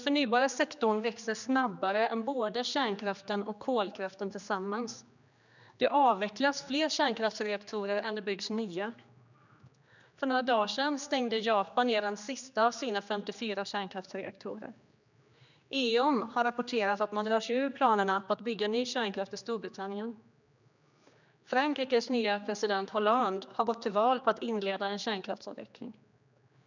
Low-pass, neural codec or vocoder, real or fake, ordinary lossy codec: 7.2 kHz; codec, 16 kHz, 2 kbps, X-Codec, HuBERT features, trained on general audio; fake; none